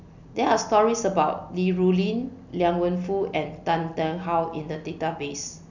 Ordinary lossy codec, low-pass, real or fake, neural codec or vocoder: none; 7.2 kHz; real; none